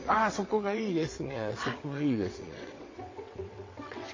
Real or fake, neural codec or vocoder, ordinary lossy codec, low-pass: fake; vocoder, 22.05 kHz, 80 mel bands, WaveNeXt; MP3, 32 kbps; 7.2 kHz